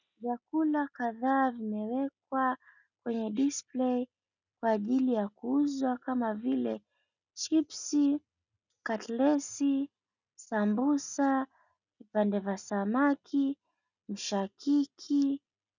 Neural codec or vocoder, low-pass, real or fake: none; 7.2 kHz; real